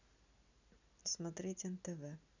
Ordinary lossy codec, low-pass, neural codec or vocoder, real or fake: none; 7.2 kHz; none; real